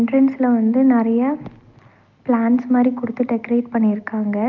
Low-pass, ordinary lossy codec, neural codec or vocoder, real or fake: 7.2 kHz; Opus, 32 kbps; none; real